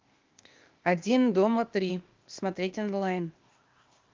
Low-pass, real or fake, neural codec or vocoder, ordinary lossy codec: 7.2 kHz; fake; codec, 16 kHz, 0.8 kbps, ZipCodec; Opus, 24 kbps